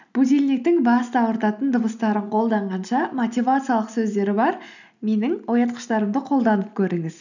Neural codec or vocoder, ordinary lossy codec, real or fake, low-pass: none; none; real; 7.2 kHz